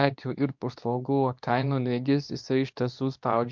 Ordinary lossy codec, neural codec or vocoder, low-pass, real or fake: MP3, 64 kbps; codec, 24 kHz, 0.9 kbps, WavTokenizer, medium speech release version 2; 7.2 kHz; fake